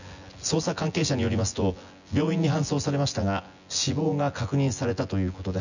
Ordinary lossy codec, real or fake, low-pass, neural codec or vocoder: none; fake; 7.2 kHz; vocoder, 24 kHz, 100 mel bands, Vocos